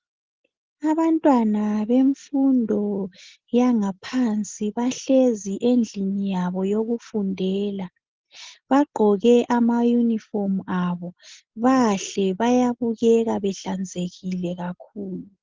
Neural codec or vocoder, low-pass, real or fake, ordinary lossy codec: none; 7.2 kHz; real; Opus, 16 kbps